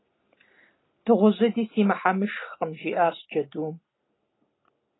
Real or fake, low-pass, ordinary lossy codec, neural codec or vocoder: real; 7.2 kHz; AAC, 16 kbps; none